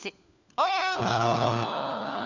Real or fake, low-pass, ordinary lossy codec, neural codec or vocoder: fake; 7.2 kHz; none; codec, 16 kHz, 4 kbps, FunCodec, trained on LibriTTS, 50 frames a second